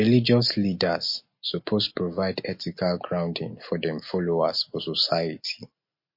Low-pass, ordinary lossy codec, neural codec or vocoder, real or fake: 5.4 kHz; MP3, 32 kbps; none; real